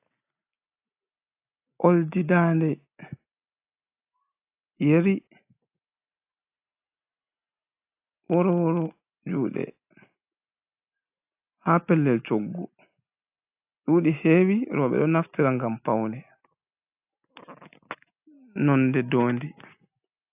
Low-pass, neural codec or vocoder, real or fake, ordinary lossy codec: 3.6 kHz; none; real; AAC, 32 kbps